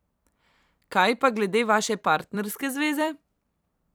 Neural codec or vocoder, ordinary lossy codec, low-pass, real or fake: vocoder, 44.1 kHz, 128 mel bands every 256 samples, BigVGAN v2; none; none; fake